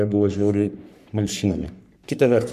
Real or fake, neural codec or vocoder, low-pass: fake; codec, 44.1 kHz, 3.4 kbps, Pupu-Codec; 14.4 kHz